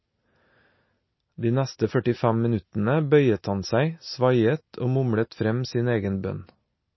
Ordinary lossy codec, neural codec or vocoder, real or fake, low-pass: MP3, 24 kbps; none; real; 7.2 kHz